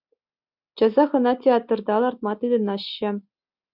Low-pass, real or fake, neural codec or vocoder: 5.4 kHz; real; none